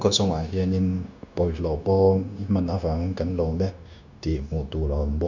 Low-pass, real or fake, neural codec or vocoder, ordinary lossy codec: 7.2 kHz; fake; codec, 16 kHz, 0.9 kbps, LongCat-Audio-Codec; none